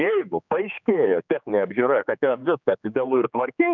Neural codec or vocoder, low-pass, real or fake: codec, 16 kHz, 4 kbps, X-Codec, HuBERT features, trained on general audio; 7.2 kHz; fake